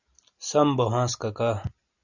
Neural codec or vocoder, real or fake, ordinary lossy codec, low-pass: none; real; Opus, 64 kbps; 7.2 kHz